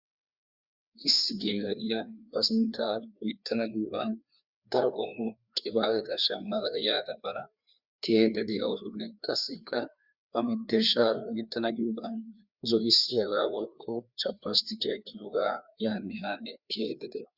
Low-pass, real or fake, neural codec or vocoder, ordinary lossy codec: 5.4 kHz; fake; codec, 16 kHz, 2 kbps, FreqCodec, larger model; Opus, 64 kbps